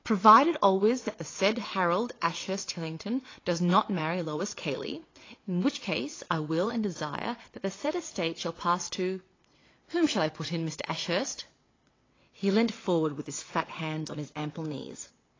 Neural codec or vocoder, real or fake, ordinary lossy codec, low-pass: vocoder, 22.05 kHz, 80 mel bands, WaveNeXt; fake; AAC, 32 kbps; 7.2 kHz